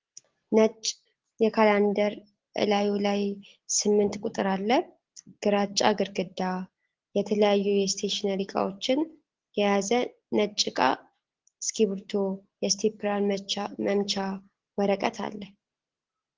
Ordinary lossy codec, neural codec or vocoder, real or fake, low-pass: Opus, 16 kbps; none; real; 7.2 kHz